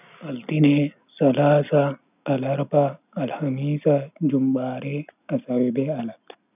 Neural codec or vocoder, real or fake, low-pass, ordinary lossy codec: none; real; 3.6 kHz; none